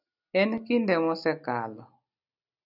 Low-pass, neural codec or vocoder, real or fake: 5.4 kHz; none; real